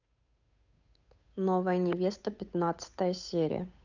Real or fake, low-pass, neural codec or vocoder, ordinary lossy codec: fake; 7.2 kHz; codec, 16 kHz, 8 kbps, FunCodec, trained on Chinese and English, 25 frames a second; none